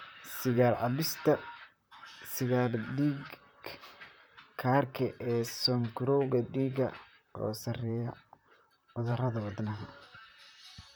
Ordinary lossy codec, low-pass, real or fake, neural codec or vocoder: none; none; fake; vocoder, 44.1 kHz, 128 mel bands, Pupu-Vocoder